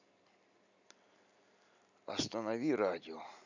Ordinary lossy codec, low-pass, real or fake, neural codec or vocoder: none; 7.2 kHz; real; none